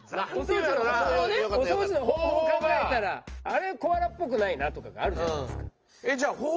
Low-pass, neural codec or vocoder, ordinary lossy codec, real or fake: 7.2 kHz; none; Opus, 24 kbps; real